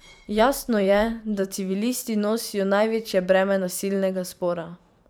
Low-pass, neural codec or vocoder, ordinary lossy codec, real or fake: none; vocoder, 44.1 kHz, 128 mel bands every 512 samples, BigVGAN v2; none; fake